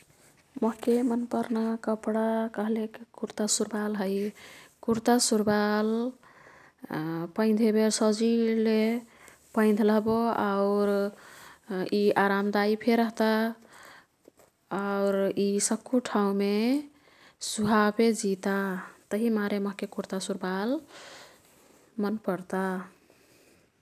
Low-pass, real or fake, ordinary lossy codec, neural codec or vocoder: 14.4 kHz; real; none; none